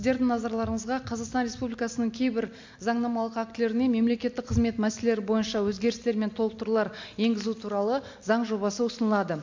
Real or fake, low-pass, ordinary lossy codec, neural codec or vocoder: real; 7.2 kHz; MP3, 48 kbps; none